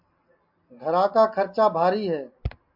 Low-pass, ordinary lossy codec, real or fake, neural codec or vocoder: 5.4 kHz; MP3, 48 kbps; real; none